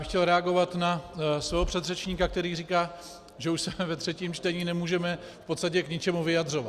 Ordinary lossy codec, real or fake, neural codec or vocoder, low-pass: Opus, 64 kbps; real; none; 14.4 kHz